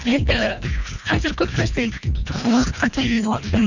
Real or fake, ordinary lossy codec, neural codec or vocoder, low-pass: fake; none; codec, 24 kHz, 1.5 kbps, HILCodec; 7.2 kHz